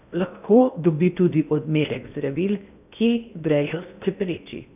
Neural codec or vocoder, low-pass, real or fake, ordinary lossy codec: codec, 16 kHz in and 24 kHz out, 0.6 kbps, FocalCodec, streaming, 2048 codes; 3.6 kHz; fake; none